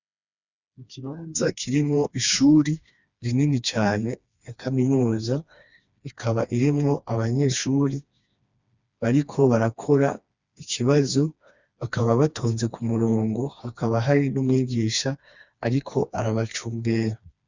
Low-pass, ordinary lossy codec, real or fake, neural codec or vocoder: 7.2 kHz; Opus, 64 kbps; fake; codec, 16 kHz, 2 kbps, FreqCodec, smaller model